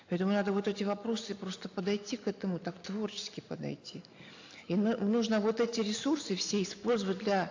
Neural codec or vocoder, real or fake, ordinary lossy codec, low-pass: none; real; AAC, 48 kbps; 7.2 kHz